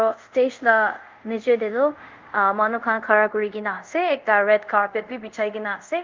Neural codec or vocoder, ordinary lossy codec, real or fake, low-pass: codec, 24 kHz, 0.5 kbps, DualCodec; Opus, 32 kbps; fake; 7.2 kHz